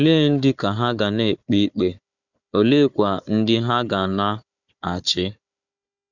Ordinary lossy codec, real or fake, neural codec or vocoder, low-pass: none; fake; codec, 16 kHz, 4 kbps, FunCodec, trained on Chinese and English, 50 frames a second; 7.2 kHz